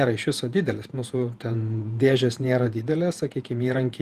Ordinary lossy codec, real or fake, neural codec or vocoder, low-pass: Opus, 24 kbps; fake; vocoder, 48 kHz, 128 mel bands, Vocos; 14.4 kHz